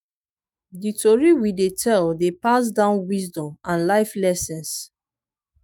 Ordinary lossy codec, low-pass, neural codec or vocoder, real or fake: none; none; autoencoder, 48 kHz, 128 numbers a frame, DAC-VAE, trained on Japanese speech; fake